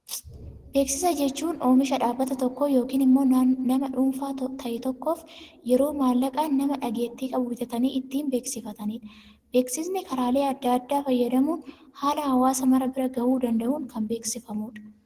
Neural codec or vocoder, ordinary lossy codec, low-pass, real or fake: none; Opus, 16 kbps; 14.4 kHz; real